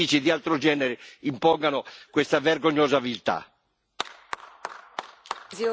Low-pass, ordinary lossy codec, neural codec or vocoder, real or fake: none; none; none; real